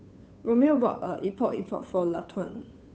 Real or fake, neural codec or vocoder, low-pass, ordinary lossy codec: fake; codec, 16 kHz, 2 kbps, FunCodec, trained on Chinese and English, 25 frames a second; none; none